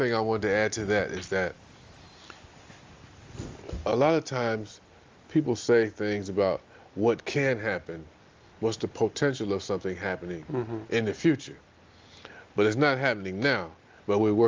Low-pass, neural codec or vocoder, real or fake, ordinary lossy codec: 7.2 kHz; none; real; Opus, 32 kbps